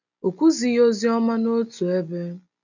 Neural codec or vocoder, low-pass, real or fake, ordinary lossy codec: none; 7.2 kHz; real; none